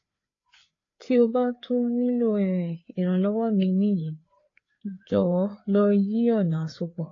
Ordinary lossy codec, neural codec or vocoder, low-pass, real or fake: AAC, 32 kbps; codec, 16 kHz, 4 kbps, FreqCodec, larger model; 7.2 kHz; fake